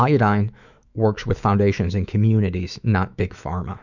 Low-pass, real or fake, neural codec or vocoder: 7.2 kHz; fake; autoencoder, 48 kHz, 128 numbers a frame, DAC-VAE, trained on Japanese speech